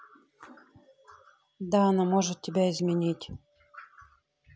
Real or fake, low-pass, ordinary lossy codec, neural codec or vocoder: real; none; none; none